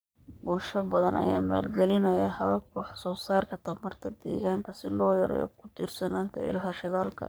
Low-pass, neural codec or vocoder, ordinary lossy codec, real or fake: none; codec, 44.1 kHz, 3.4 kbps, Pupu-Codec; none; fake